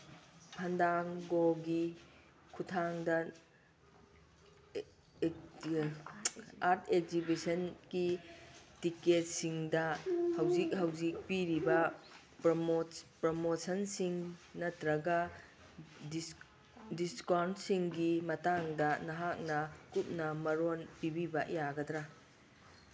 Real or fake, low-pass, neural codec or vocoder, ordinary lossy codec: real; none; none; none